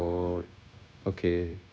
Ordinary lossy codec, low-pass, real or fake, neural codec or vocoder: none; none; fake; codec, 16 kHz, 0.9 kbps, LongCat-Audio-Codec